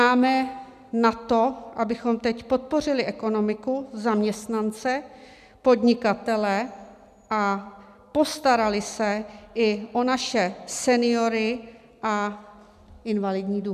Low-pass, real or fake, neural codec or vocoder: 14.4 kHz; real; none